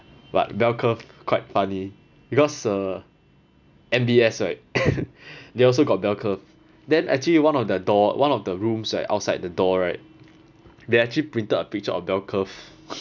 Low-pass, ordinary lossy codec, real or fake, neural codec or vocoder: 7.2 kHz; none; real; none